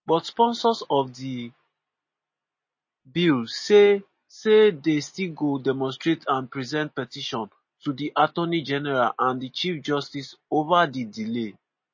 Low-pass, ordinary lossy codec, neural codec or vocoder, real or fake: 7.2 kHz; MP3, 32 kbps; none; real